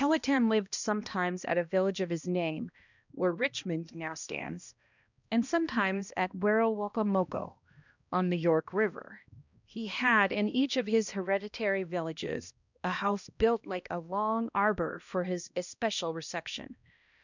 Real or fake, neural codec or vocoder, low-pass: fake; codec, 16 kHz, 1 kbps, X-Codec, HuBERT features, trained on balanced general audio; 7.2 kHz